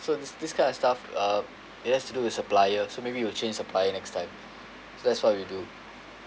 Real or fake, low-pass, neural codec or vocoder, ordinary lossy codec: real; none; none; none